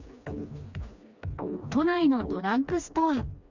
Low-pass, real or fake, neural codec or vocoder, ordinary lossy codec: 7.2 kHz; fake; codec, 16 kHz, 2 kbps, FreqCodec, smaller model; none